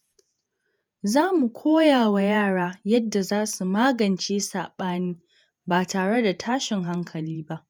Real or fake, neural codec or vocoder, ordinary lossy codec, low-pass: fake; vocoder, 48 kHz, 128 mel bands, Vocos; none; none